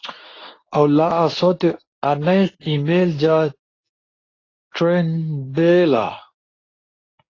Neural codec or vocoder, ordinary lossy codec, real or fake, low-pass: codec, 24 kHz, 0.9 kbps, WavTokenizer, medium speech release version 2; AAC, 32 kbps; fake; 7.2 kHz